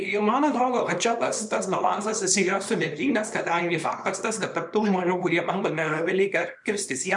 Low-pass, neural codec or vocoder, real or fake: 10.8 kHz; codec, 24 kHz, 0.9 kbps, WavTokenizer, small release; fake